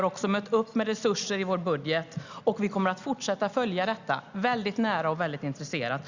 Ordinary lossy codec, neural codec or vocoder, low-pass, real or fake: Opus, 64 kbps; none; 7.2 kHz; real